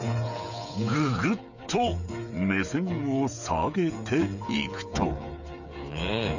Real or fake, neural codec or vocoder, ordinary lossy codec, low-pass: fake; codec, 16 kHz, 8 kbps, FreqCodec, smaller model; none; 7.2 kHz